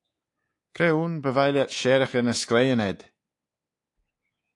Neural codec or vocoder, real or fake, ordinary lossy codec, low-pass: codec, 24 kHz, 3.1 kbps, DualCodec; fake; AAC, 48 kbps; 10.8 kHz